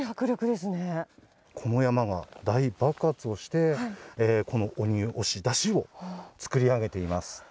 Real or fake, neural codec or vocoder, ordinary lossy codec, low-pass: real; none; none; none